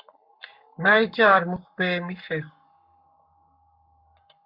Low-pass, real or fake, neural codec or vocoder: 5.4 kHz; fake; codec, 44.1 kHz, 7.8 kbps, Pupu-Codec